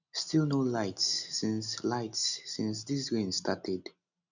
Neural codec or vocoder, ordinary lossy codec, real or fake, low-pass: none; none; real; 7.2 kHz